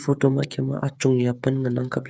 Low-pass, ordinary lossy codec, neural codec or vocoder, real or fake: none; none; codec, 16 kHz, 8 kbps, FreqCodec, smaller model; fake